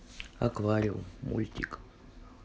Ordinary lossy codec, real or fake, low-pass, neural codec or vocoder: none; real; none; none